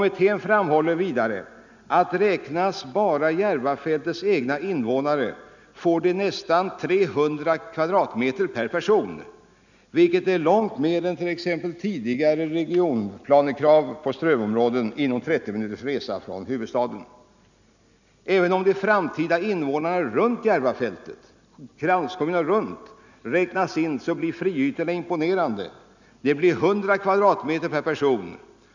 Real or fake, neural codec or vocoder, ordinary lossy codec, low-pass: real; none; none; 7.2 kHz